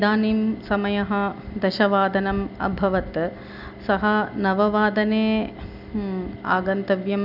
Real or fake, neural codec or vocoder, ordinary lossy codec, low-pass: real; none; none; 5.4 kHz